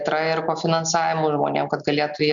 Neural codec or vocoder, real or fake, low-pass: none; real; 7.2 kHz